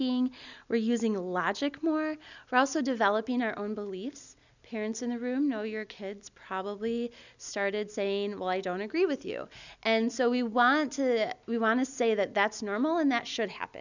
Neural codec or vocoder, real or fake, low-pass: none; real; 7.2 kHz